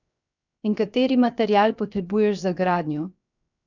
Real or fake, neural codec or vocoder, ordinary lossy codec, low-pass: fake; codec, 16 kHz, 0.7 kbps, FocalCodec; none; 7.2 kHz